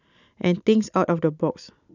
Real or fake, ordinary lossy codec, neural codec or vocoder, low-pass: real; none; none; 7.2 kHz